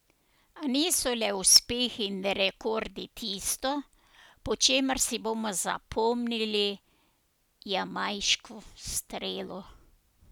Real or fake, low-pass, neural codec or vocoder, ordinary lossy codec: real; none; none; none